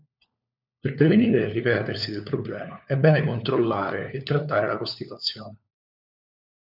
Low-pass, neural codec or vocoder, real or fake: 5.4 kHz; codec, 16 kHz, 4 kbps, FunCodec, trained on LibriTTS, 50 frames a second; fake